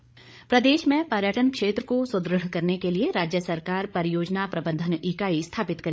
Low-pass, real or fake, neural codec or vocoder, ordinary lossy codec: none; fake; codec, 16 kHz, 8 kbps, FreqCodec, larger model; none